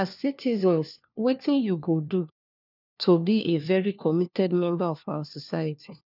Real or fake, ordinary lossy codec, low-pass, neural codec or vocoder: fake; none; 5.4 kHz; codec, 16 kHz, 1 kbps, FunCodec, trained on LibriTTS, 50 frames a second